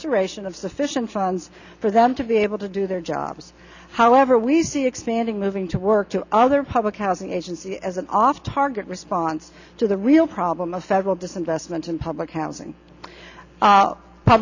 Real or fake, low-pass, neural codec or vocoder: real; 7.2 kHz; none